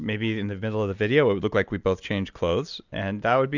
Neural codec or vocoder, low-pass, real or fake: none; 7.2 kHz; real